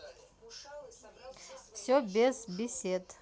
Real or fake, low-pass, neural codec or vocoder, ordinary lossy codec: real; none; none; none